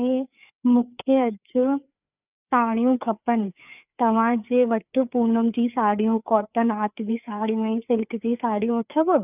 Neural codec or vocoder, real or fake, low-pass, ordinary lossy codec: codec, 16 kHz, 4 kbps, FreqCodec, larger model; fake; 3.6 kHz; none